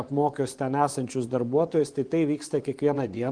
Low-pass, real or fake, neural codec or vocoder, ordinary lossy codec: 9.9 kHz; fake; vocoder, 24 kHz, 100 mel bands, Vocos; Opus, 24 kbps